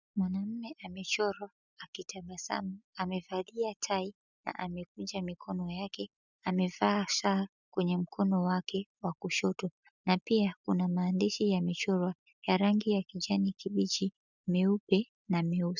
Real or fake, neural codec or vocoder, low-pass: real; none; 7.2 kHz